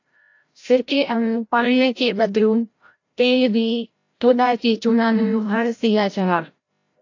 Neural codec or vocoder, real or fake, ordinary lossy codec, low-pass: codec, 16 kHz, 0.5 kbps, FreqCodec, larger model; fake; AAC, 48 kbps; 7.2 kHz